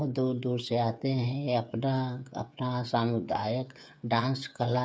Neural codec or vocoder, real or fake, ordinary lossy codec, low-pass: codec, 16 kHz, 8 kbps, FreqCodec, smaller model; fake; none; none